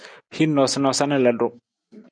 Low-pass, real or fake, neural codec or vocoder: 9.9 kHz; real; none